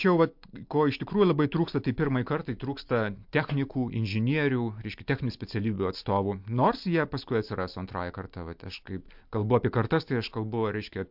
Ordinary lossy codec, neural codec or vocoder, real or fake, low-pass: MP3, 48 kbps; none; real; 5.4 kHz